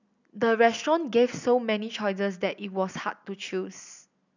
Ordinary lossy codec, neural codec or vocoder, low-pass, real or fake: none; none; 7.2 kHz; real